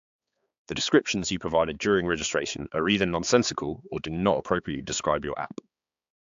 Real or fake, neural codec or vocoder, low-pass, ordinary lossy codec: fake; codec, 16 kHz, 4 kbps, X-Codec, HuBERT features, trained on balanced general audio; 7.2 kHz; AAC, 64 kbps